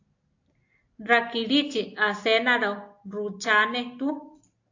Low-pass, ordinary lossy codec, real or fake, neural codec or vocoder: 7.2 kHz; AAC, 48 kbps; real; none